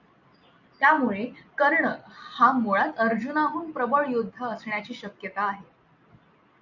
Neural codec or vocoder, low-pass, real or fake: none; 7.2 kHz; real